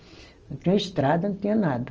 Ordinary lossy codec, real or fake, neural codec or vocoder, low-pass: Opus, 24 kbps; real; none; 7.2 kHz